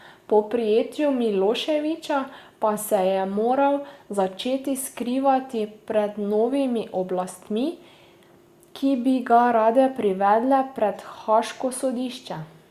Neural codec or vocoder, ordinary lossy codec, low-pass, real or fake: none; Opus, 64 kbps; 14.4 kHz; real